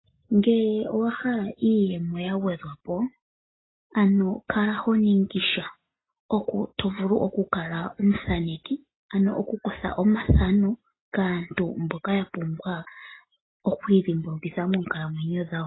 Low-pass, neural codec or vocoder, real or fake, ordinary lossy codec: 7.2 kHz; none; real; AAC, 16 kbps